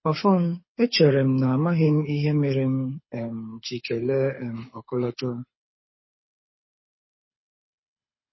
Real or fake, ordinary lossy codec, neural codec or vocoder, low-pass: fake; MP3, 24 kbps; codec, 24 kHz, 6 kbps, HILCodec; 7.2 kHz